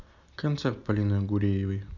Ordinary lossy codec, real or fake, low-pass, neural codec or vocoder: none; real; 7.2 kHz; none